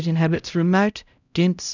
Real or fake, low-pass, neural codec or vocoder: fake; 7.2 kHz; codec, 16 kHz, 0.5 kbps, X-Codec, HuBERT features, trained on LibriSpeech